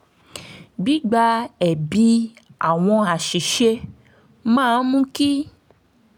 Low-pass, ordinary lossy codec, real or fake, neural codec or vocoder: none; none; real; none